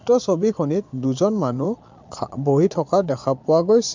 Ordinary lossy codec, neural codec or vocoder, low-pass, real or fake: MP3, 64 kbps; vocoder, 22.05 kHz, 80 mel bands, Vocos; 7.2 kHz; fake